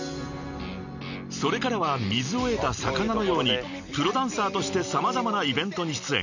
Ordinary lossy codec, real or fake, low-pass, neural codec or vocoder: none; real; 7.2 kHz; none